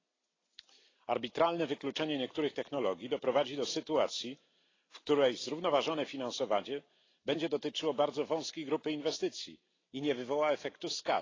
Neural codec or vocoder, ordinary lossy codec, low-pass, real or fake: none; AAC, 32 kbps; 7.2 kHz; real